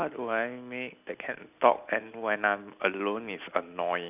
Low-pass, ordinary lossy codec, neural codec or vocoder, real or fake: 3.6 kHz; none; none; real